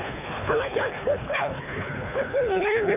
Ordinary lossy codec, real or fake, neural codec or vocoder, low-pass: none; fake; codec, 24 kHz, 3 kbps, HILCodec; 3.6 kHz